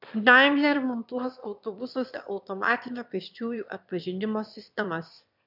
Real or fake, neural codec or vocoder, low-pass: fake; autoencoder, 22.05 kHz, a latent of 192 numbers a frame, VITS, trained on one speaker; 5.4 kHz